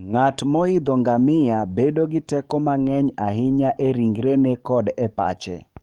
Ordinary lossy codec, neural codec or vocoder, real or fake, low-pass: Opus, 32 kbps; codec, 44.1 kHz, 7.8 kbps, DAC; fake; 19.8 kHz